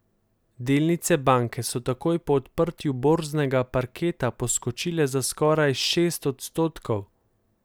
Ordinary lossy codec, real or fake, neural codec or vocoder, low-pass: none; real; none; none